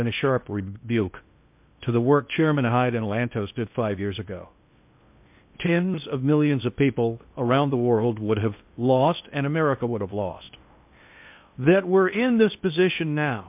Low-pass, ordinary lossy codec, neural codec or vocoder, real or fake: 3.6 kHz; MP3, 32 kbps; codec, 16 kHz in and 24 kHz out, 0.6 kbps, FocalCodec, streaming, 2048 codes; fake